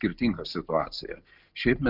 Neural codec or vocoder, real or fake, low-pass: none; real; 5.4 kHz